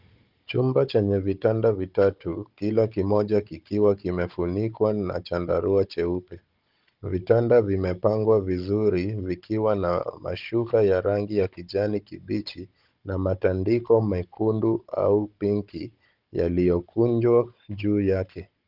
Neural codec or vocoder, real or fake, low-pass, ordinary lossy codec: codec, 16 kHz, 16 kbps, FunCodec, trained on Chinese and English, 50 frames a second; fake; 5.4 kHz; Opus, 24 kbps